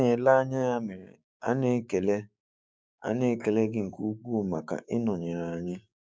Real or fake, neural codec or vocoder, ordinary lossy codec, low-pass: fake; codec, 16 kHz, 6 kbps, DAC; none; none